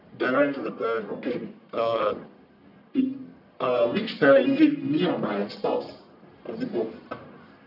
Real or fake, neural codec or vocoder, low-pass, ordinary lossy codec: fake; codec, 44.1 kHz, 1.7 kbps, Pupu-Codec; 5.4 kHz; none